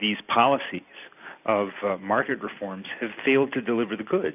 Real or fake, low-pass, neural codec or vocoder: real; 3.6 kHz; none